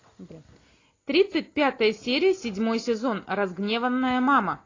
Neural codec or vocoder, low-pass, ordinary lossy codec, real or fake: none; 7.2 kHz; AAC, 32 kbps; real